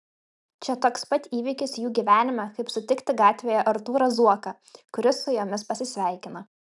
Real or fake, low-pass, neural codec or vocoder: real; 10.8 kHz; none